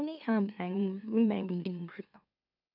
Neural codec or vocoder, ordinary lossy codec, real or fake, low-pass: autoencoder, 44.1 kHz, a latent of 192 numbers a frame, MeloTTS; none; fake; 5.4 kHz